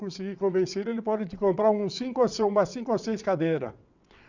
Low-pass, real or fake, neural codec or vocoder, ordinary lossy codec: 7.2 kHz; fake; vocoder, 22.05 kHz, 80 mel bands, WaveNeXt; none